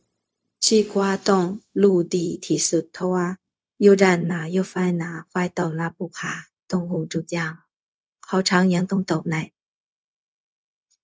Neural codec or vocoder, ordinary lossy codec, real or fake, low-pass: codec, 16 kHz, 0.4 kbps, LongCat-Audio-Codec; none; fake; none